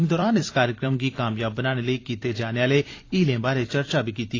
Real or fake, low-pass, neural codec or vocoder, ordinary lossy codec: fake; 7.2 kHz; vocoder, 44.1 kHz, 128 mel bands every 256 samples, BigVGAN v2; AAC, 32 kbps